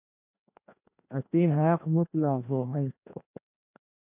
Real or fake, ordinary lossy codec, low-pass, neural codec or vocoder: fake; AAC, 32 kbps; 3.6 kHz; codec, 16 kHz, 1 kbps, FreqCodec, larger model